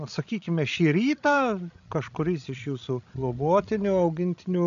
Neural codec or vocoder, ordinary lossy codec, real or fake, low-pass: codec, 16 kHz, 16 kbps, FreqCodec, larger model; AAC, 96 kbps; fake; 7.2 kHz